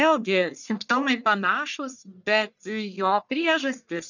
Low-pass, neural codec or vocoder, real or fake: 7.2 kHz; codec, 44.1 kHz, 1.7 kbps, Pupu-Codec; fake